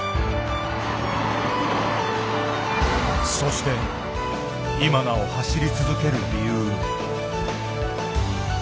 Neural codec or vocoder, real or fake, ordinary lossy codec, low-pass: none; real; none; none